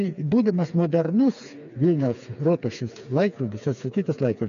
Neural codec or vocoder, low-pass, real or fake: codec, 16 kHz, 4 kbps, FreqCodec, smaller model; 7.2 kHz; fake